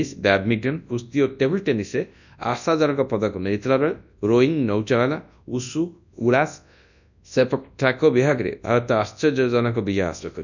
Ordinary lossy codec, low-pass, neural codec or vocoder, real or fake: none; 7.2 kHz; codec, 24 kHz, 0.9 kbps, WavTokenizer, large speech release; fake